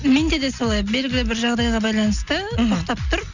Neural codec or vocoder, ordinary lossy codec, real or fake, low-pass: none; none; real; 7.2 kHz